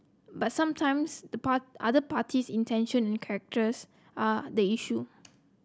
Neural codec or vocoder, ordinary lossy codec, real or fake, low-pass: none; none; real; none